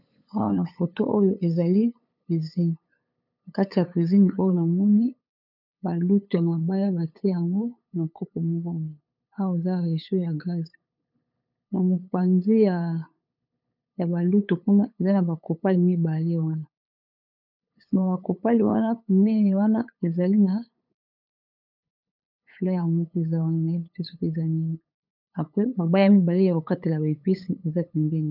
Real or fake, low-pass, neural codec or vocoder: fake; 5.4 kHz; codec, 16 kHz, 8 kbps, FunCodec, trained on LibriTTS, 25 frames a second